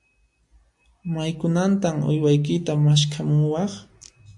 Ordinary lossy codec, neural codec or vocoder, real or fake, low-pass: AAC, 64 kbps; none; real; 10.8 kHz